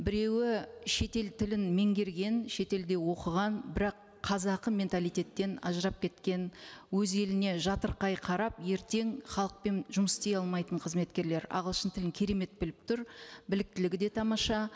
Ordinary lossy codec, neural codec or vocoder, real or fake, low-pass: none; none; real; none